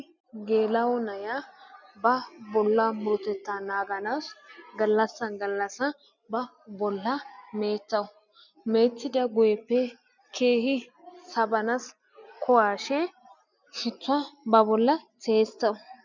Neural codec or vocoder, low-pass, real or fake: none; 7.2 kHz; real